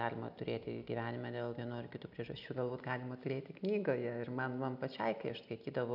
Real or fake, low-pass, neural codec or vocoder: real; 5.4 kHz; none